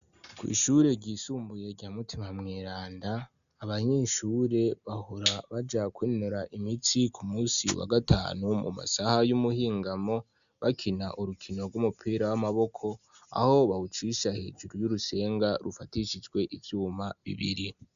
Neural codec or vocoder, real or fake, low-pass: none; real; 7.2 kHz